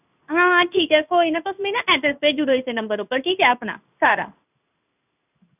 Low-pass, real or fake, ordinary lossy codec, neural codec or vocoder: 3.6 kHz; fake; none; codec, 16 kHz in and 24 kHz out, 1 kbps, XY-Tokenizer